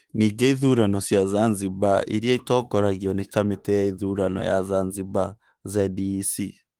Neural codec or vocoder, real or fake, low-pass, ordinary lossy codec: autoencoder, 48 kHz, 32 numbers a frame, DAC-VAE, trained on Japanese speech; fake; 19.8 kHz; Opus, 24 kbps